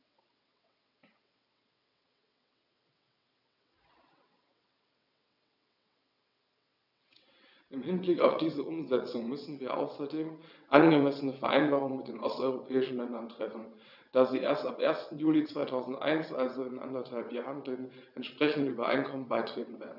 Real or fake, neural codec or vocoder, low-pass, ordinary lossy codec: fake; vocoder, 22.05 kHz, 80 mel bands, WaveNeXt; 5.4 kHz; none